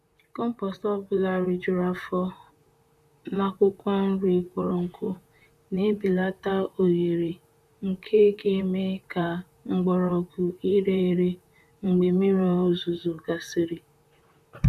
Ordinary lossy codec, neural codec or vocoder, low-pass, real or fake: none; vocoder, 44.1 kHz, 128 mel bands, Pupu-Vocoder; 14.4 kHz; fake